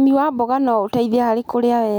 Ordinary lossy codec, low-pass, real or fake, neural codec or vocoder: none; 19.8 kHz; real; none